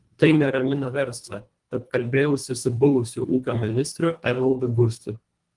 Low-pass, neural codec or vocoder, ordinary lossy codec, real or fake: 10.8 kHz; codec, 24 kHz, 1.5 kbps, HILCodec; Opus, 32 kbps; fake